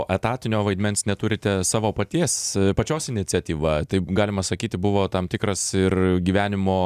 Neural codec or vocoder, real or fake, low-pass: none; real; 14.4 kHz